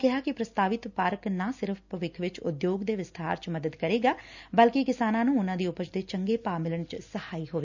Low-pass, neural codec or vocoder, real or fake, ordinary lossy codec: 7.2 kHz; none; real; none